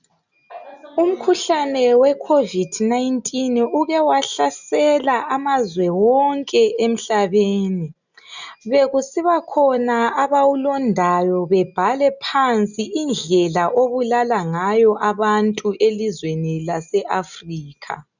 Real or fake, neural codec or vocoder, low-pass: real; none; 7.2 kHz